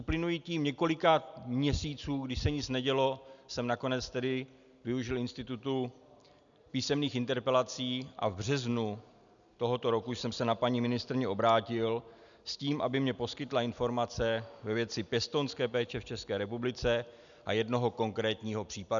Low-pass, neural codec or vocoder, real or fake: 7.2 kHz; none; real